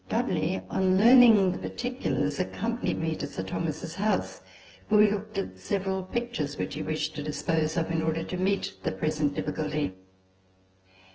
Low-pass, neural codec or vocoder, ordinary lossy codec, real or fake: 7.2 kHz; vocoder, 24 kHz, 100 mel bands, Vocos; Opus, 16 kbps; fake